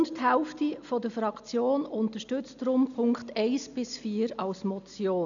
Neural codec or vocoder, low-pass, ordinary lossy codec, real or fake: none; 7.2 kHz; none; real